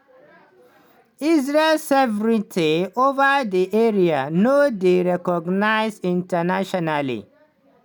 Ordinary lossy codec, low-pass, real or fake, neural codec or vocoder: none; none; real; none